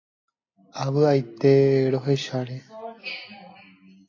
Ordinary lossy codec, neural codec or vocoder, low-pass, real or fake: AAC, 32 kbps; none; 7.2 kHz; real